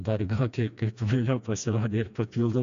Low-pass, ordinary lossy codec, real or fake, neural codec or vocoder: 7.2 kHz; MP3, 64 kbps; fake; codec, 16 kHz, 2 kbps, FreqCodec, smaller model